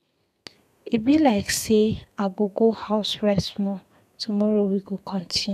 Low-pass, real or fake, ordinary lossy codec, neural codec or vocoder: 14.4 kHz; fake; none; codec, 32 kHz, 1.9 kbps, SNAC